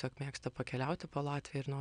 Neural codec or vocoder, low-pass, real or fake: none; 9.9 kHz; real